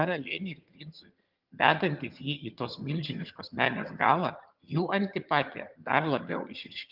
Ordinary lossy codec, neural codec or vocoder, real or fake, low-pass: Opus, 32 kbps; vocoder, 22.05 kHz, 80 mel bands, HiFi-GAN; fake; 5.4 kHz